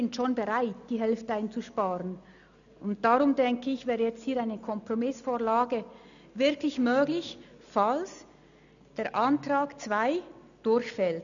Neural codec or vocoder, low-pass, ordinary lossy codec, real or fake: none; 7.2 kHz; none; real